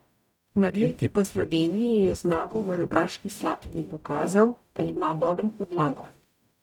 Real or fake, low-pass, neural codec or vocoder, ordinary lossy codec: fake; 19.8 kHz; codec, 44.1 kHz, 0.9 kbps, DAC; none